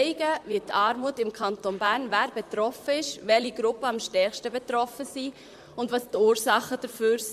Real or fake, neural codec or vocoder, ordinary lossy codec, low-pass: fake; vocoder, 44.1 kHz, 128 mel bands, Pupu-Vocoder; MP3, 96 kbps; 14.4 kHz